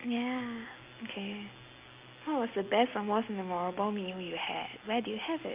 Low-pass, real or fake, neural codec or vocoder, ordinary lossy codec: 3.6 kHz; real; none; Opus, 24 kbps